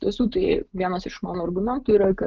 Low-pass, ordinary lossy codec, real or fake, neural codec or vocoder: 7.2 kHz; Opus, 32 kbps; real; none